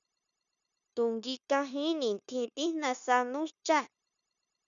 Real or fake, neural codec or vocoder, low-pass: fake; codec, 16 kHz, 0.9 kbps, LongCat-Audio-Codec; 7.2 kHz